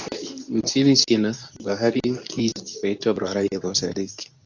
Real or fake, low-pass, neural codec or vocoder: fake; 7.2 kHz; codec, 24 kHz, 0.9 kbps, WavTokenizer, medium speech release version 2